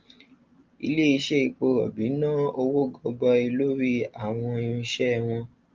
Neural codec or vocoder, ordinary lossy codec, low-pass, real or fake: none; Opus, 24 kbps; 7.2 kHz; real